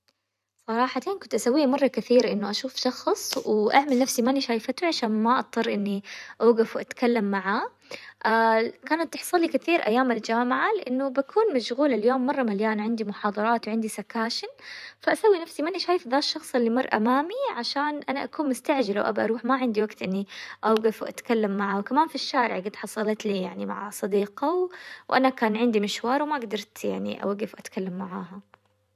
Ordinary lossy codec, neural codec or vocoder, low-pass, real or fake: none; vocoder, 44.1 kHz, 128 mel bands every 512 samples, BigVGAN v2; 14.4 kHz; fake